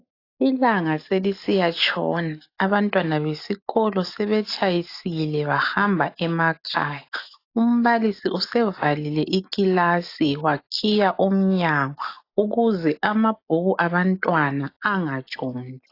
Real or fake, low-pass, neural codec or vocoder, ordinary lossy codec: real; 5.4 kHz; none; AAC, 32 kbps